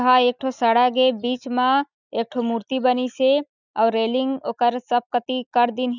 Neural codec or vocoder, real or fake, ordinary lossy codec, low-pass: none; real; none; 7.2 kHz